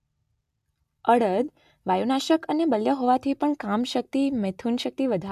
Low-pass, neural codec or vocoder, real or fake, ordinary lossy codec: 14.4 kHz; none; real; AAC, 96 kbps